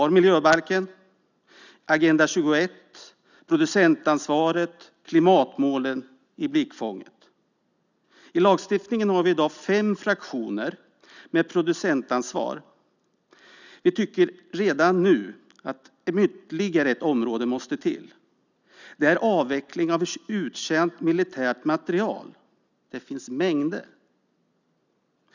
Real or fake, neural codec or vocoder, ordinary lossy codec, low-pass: real; none; none; 7.2 kHz